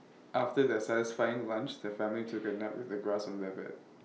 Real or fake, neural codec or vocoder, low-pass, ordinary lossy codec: real; none; none; none